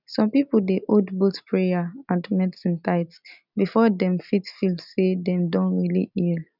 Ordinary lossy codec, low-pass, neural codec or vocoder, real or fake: none; 5.4 kHz; none; real